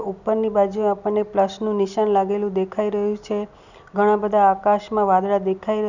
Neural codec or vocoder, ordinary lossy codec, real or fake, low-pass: none; none; real; 7.2 kHz